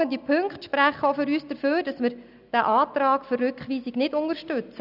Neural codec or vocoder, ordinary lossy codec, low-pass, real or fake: none; none; 5.4 kHz; real